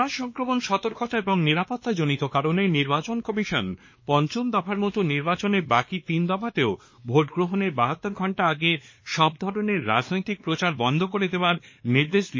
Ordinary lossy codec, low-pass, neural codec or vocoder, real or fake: MP3, 32 kbps; 7.2 kHz; codec, 16 kHz, 2 kbps, X-Codec, WavLM features, trained on Multilingual LibriSpeech; fake